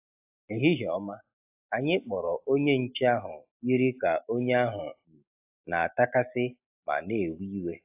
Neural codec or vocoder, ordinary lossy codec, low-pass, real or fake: none; AAC, 32 kbps; 3.6 kHz; real